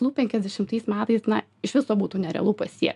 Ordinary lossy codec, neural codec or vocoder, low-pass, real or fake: MP3, 96 kbps; none; 10.8 kHz; real